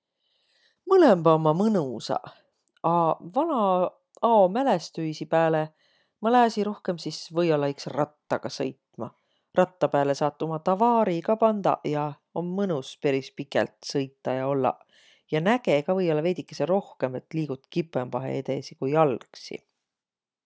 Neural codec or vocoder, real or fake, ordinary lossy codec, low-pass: none; real; none; none